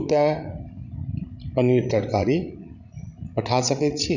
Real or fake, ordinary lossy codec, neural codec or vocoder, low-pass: fake; none; codec, 16 kHz, 16 kbps, FreqCodec, larger model; 7.2 kHz